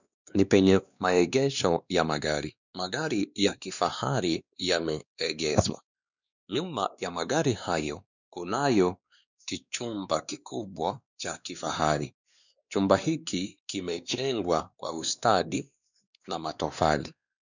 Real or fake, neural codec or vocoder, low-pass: fake; codec, 16 kHz, 2 kbps, X-Codec, WavLM features, trained on Multilingual LibriSpeech; 7.2 kHz